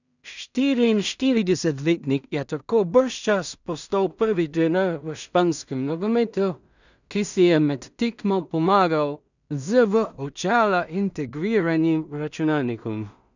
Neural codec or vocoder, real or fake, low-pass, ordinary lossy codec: codec, 16 kHz in and 24 kHz out, 0.4 kbps, LongCat-Audio-Codec, two codebook decoder; fake; 7.2 kHz; none